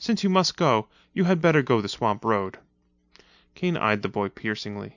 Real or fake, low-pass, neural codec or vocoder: real; 7.2 kHz; none